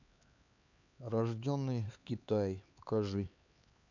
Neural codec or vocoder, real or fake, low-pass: codec, 16 kHz, 4 kbps, X-Codec, HuBERT features, trained on LibriSpeech; fake; 7.2 kHz